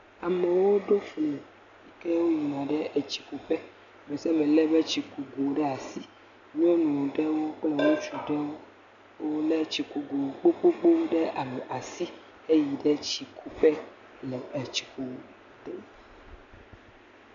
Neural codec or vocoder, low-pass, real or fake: none; 7.2 kHz; real